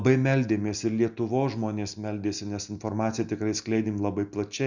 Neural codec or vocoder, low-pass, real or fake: none; 7.2 kHz; real